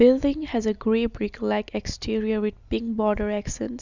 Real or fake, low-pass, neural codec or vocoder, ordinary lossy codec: real; 7.2 kHz; none; none